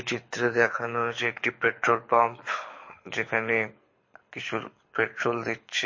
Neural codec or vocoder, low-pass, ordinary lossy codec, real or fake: codec, 24 kHz, 6 kbps, HILCodec; 7.2 kHz; MP3, 32 kbps; fake